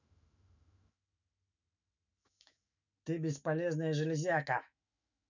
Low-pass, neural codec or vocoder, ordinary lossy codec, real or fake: 7.2 kHz; autoencoder, 48 kHz, 128 numbers a frame, DAC-VAE, trained on Japanese speech; none; fake